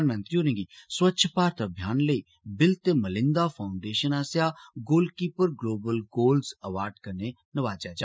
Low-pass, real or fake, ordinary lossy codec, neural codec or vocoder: none; real; none; none